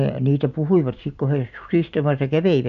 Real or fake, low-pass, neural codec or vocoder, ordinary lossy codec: real; 7.2 kHz; none; none